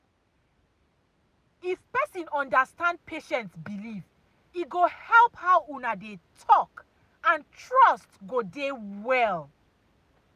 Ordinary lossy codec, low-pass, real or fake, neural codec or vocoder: none; 14.4 kHz; real; none